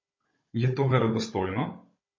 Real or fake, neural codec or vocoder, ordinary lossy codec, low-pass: fake; codec, 16 kHz, 16 kbps, FunCodec, trained on Chinese and English, 50 frames a second; MP3, 32 kbps; 7.2 kHz